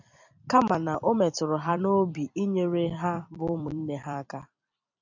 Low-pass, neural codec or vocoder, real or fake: 7.2 kHz; vocoder, 44.1 kHz, 128 mel bands every 256 samples, BigVGAN v2; fake